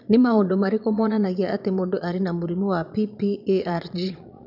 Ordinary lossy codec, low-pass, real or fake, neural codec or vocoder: none; 5.4 kHz; fake; vocoder, 22.05 kHz, 80 mel bands, Vocos